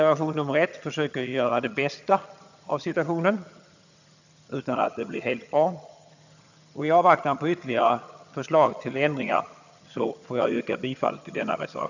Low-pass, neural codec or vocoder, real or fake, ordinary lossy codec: 7.2 kHz; vocoder, 22.05 kHz, 80 mel bands, HiFi-GAN; fake; none